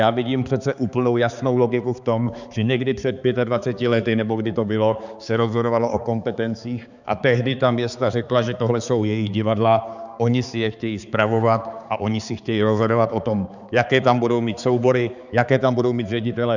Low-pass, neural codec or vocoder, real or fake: 7.2 kHz; codec, 16 kHz, 4 kbps, X-Codec, HuBERT features, trained on balanced general audio; fake